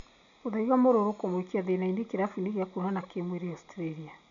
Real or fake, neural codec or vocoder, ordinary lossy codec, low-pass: real; none; none; 7.2 kHz